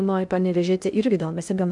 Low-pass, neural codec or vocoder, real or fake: 10.8 kHz; codec, 16 kHz in and 24 kHz out, 0.6 kbps, FocalCodec, streaming, 2048 codes; fake